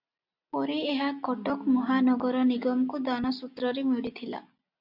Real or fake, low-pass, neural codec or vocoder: real; 5.4 kHz; none